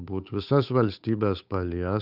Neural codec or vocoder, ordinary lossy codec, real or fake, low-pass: codec, 16 kHz, 8 kbps, FunCodec, trained on Chinese and English, 25 frames a second; Opus, 64 kbps; fake; 5.4 kHz